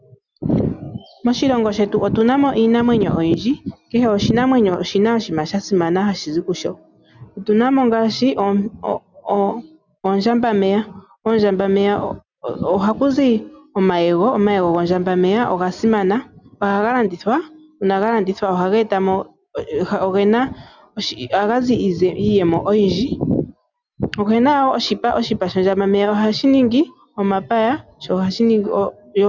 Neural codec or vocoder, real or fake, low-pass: none; real; 7.2 kHz